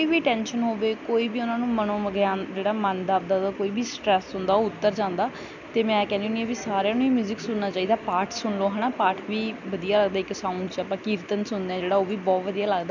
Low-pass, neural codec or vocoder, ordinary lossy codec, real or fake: 7.2 kHz; none; none; real